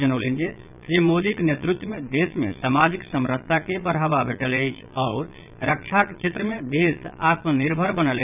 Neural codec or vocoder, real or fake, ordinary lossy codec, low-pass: vocoder, 22.05 kHz, 80 mel bands, Vocos; fake; none; 3.6 kHz